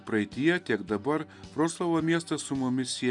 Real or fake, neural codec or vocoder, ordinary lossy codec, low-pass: real; none; MP3, 96 kbps; 10.8 kHz